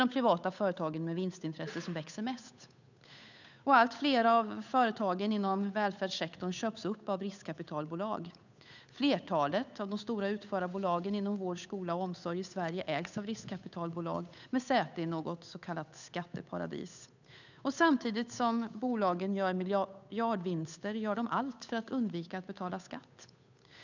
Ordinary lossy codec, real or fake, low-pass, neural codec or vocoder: none; fake; 7.2 kHz; codec, 16 kHz, 8 kbps, FunCodec, trained on Chinese and English, 25 frames a second